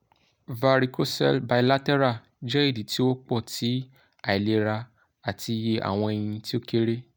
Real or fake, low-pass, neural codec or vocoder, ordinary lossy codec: real; none; none; none